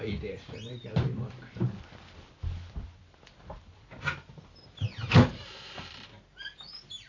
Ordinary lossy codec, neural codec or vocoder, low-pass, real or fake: AAC, 32 kbps; vocoder, 44.1 kHz, 128 mel bands every 256 samples, BigVGAN v2; 7.2 kHz; fake